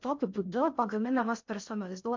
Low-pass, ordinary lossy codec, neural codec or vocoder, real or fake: 7.2 kHz; MP3, 64 kbps; codec, 16 kHz in and 24 kHz out, 0.6 kbps, FocalCodec, streaming, 4096 codes; fake